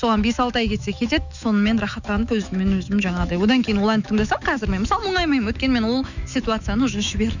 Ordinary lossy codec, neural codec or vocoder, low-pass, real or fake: none; autoencoder, 48 kHz, 128 numbers a frame, DAC-VAE, trained on Japanese speech; 7.2 kHz; fake